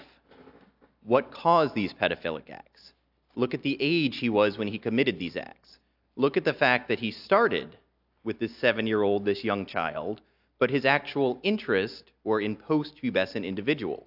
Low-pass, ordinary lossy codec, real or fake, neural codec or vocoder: 5.4 kHz; AAC, 48 kbps; real; none